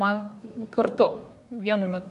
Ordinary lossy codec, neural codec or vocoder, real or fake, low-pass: AAC, 64 kbps; codec, 24 kHz, 1 kbps, SNAC; fake; 10.8 kHz